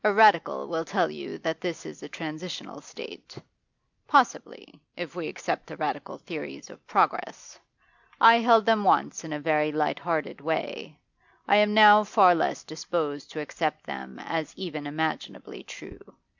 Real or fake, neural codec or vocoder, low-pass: real; none; 7.2 kHz